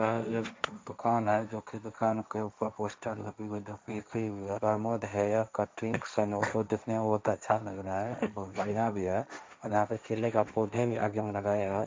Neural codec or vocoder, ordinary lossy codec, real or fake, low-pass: codec, 16 kHz, 1.1 kbps, Voila-Tokenizer; none; fake; none